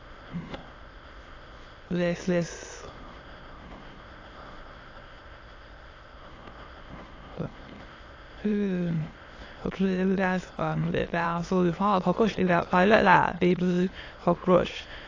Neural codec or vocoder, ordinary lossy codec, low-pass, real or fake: autoencoder, 22.05 kHz, a latent of 192 numbers a frame, VITS, trained on many speakers; AAC, 32 kbps; 7.2 kHz; fake